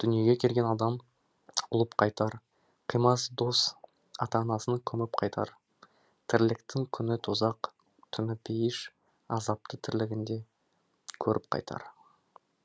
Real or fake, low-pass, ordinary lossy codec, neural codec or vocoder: real; none; none; none